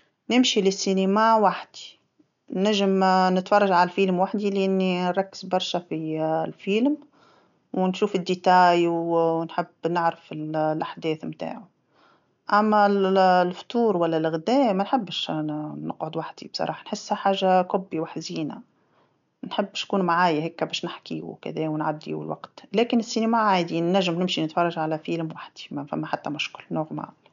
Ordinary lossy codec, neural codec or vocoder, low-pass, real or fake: MP3, 96 kbps; none; 7.2 kHz; real